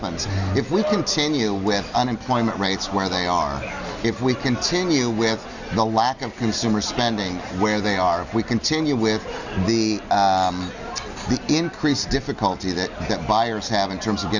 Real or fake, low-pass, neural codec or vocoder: real; 7.2 kHz; none